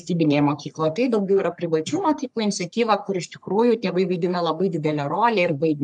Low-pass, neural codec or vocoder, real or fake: 10.8 kHz; codec, 44.1 kHz, 3.4 kbps, Pupu-Codec; fake